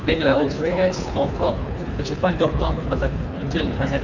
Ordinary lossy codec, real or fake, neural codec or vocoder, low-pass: none; fake; codec, 24 kHz, 3 kbps, HILCodec; 7.2 kHz